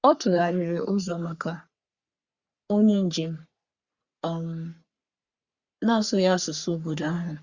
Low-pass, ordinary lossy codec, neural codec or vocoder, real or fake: 7.2 kHz; Opus, 64 kbps; codec, 44.1 kHz, 3.4 kbps, Pupu-Codec; fake